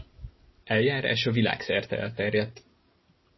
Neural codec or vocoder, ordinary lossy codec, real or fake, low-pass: none; MP3, 24 kbps; real; 7.2 kHz